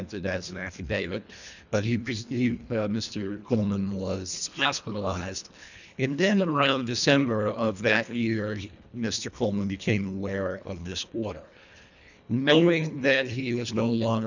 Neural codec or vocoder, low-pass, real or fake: codec, 24 kHz, 1.5 kbps, HILCodec; 7.2 kHz; fake